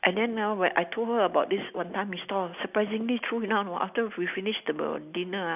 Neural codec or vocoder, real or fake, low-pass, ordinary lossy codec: none; real; 3.6 kHz; none